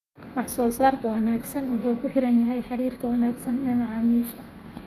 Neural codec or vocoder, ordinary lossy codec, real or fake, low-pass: codec, 32 kHz, 1.9 kbps, SNAC; none; fake; 14.4 kHz